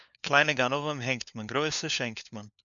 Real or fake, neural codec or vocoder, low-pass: fake; codec, 16 kHz, 4 kbps, FunCodec, trained on LibriTTS, 50 frames a second; 7.2 kHz